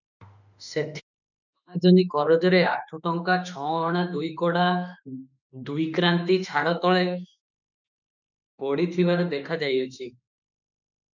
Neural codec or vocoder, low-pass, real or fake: autoencoder, 48 kHz, 32 numbers a frame, DAC-VAE, trained on Japanese speech; 7.2 kHz; fake